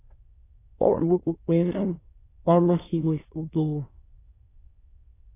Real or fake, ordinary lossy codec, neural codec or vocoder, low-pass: fake; AAC, 16 kbps; autoencoder, 22.05 kHz, a latent of 192 numbers a frame, VITS, trained on many speakers; 3.6 kHz